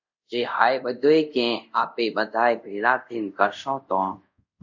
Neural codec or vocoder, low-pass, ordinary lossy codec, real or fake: codec, 24 kHz, 0.5 kbps, DualCodec; 7.2 kHz; MP3, 64 kbps; fake